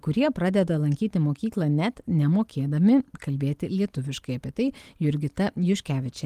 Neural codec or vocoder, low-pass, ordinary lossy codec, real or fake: none; 14.4 kHz; Opus, 24 kbps; real